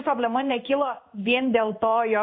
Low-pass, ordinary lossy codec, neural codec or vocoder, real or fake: 5.4 kHz; MP3, 32 kbps; codec, 16 kHz in and 24 kHz out, 1 kbps, XY-Tokenizer; fake